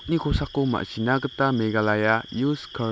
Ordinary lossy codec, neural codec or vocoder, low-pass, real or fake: none; none; none; real